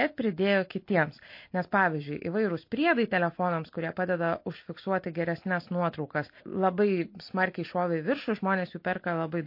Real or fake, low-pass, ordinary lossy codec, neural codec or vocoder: real; 5.4 kHz; MP3, 32 kbps; none